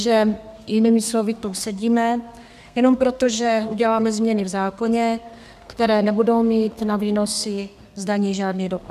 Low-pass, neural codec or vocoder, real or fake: 14.4 kHz; codec, 32 kHz, 1.9 kbps, SNAC; fake